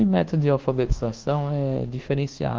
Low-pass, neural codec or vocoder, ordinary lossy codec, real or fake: 7.2 kHz; codec, 24 kHz, 1.2 kbps, DualCodec; Opus, 16 kbps; fake